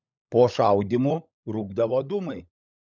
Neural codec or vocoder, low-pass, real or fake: codec, 16 kHz, 16 kbps, FunCodec, trained on LibriTTS, 50 frames a second; 7.2 kHz; fake